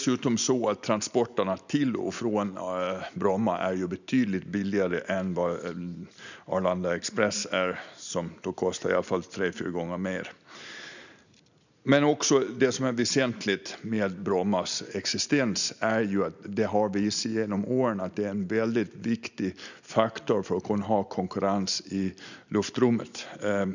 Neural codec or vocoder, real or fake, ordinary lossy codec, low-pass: none; real; none; 7.2 kHz